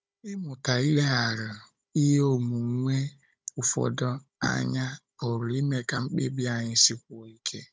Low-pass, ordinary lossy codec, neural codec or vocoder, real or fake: none; none; codec, 16 kHz, 16 kbps, FunCodec, trained on Chinese and English, 50 frames a second; fake